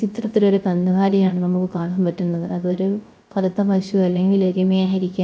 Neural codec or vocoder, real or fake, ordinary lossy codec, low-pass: codec, 16 kHz, 0.3 kbps, FocalCodec; fake; none; none